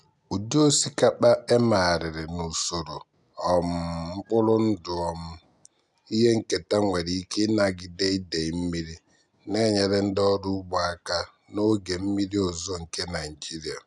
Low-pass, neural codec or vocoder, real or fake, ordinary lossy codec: 10.8 kHz; none; real; none